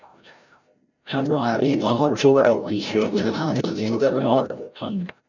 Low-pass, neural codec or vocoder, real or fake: 7.2 kHz; codec, 16 kHz, 0.5 kbps, FreqCodec, larger model; fake